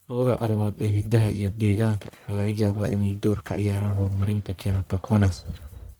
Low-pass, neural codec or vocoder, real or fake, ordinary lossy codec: none; codec, 44.1 kHz, 1.7 kbps, Pupu-Codec; fake; none